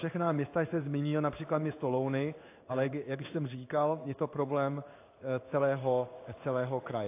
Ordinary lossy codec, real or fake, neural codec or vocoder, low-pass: AAC, 24 kbps; fake; codec, 16 kHz in and 24 kHz out, 1 kbps, XY-Tokenizer; 3.6 kHz